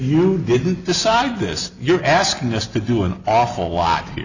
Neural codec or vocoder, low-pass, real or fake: none; 7.2 kHz; real